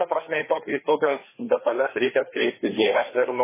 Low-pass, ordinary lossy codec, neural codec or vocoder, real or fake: 3.6 kHz; MP3, 16 kbps; codec, 16 kHz, 2 kbps, FreqCodec, larger model; fake